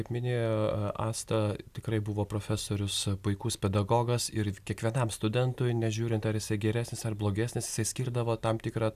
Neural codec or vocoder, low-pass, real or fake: none; 14.4 kHz; real